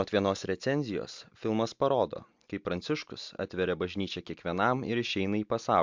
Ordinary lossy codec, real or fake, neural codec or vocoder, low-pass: MP3, 64 kbps; real; none; 7.2 kHz